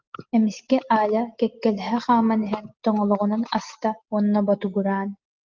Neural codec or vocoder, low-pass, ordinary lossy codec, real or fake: none; 7.2 kHz; Opus, 24 kbps; real